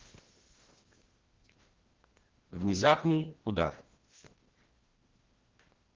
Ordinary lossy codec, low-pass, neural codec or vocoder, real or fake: Opus, 16 kbps; 7.2 kHz; codec, 16 kHz, 1 kbps, FreqCodec, larger model; fake